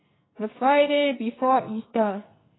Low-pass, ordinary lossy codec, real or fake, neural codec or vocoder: 7.2 kHz; AAC, 16 kbps; fake; codec, 44.1 kHz, 2.6 kbps, SNAC